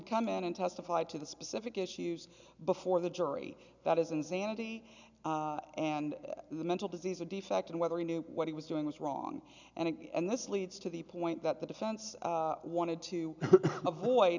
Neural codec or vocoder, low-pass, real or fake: none; 7.2 kHz; real